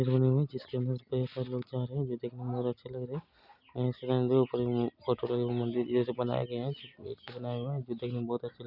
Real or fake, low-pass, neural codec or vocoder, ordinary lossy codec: real; 5.4 kHz; none; none